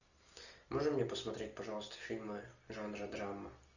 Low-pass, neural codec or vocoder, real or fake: 7.2 kHz; none; real